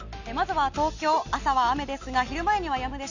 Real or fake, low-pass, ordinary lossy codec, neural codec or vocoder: real; 7.2 kHz; none; none